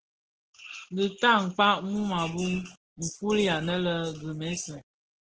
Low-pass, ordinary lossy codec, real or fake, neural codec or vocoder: 7.2 kHz; Opus, 16 kbps; real; none